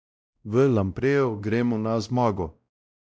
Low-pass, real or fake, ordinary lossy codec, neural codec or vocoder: none; fake; none; codec, 16 kHz, 0.5 kbps, X-Codec, WavLM features, trained on Multilingual LibriSpeech